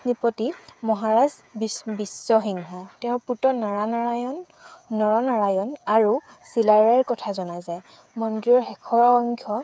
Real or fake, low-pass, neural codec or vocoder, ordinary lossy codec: fake; none; codec, 16 kHz, 8 kbps, FreqCodec, smaller model; none